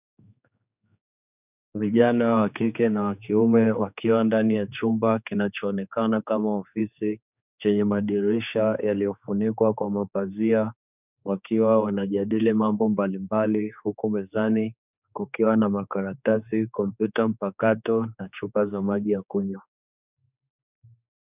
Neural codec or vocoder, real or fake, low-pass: codec, 16 kHz, 4 kbps, X-Codec, HuBERT features, trained on general audio; fake; 3.6 kHz